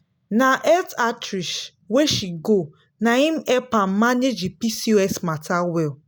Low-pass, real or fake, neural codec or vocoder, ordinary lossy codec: none; real; none; none